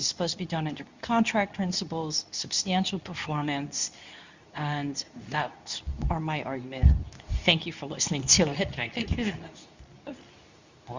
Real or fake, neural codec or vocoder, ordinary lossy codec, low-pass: fake; codec, 24 kHz, 0.9 kbps, WavTokenizer, medium speech release version 2; Opus, 64 kbps; 7.2 kHz